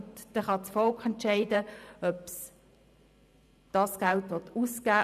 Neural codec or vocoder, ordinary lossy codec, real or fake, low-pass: vocoder, 44.1 kHz, 128 mel bands every 512 samples, BigVGAN v2; none; fake; 14.4 kHz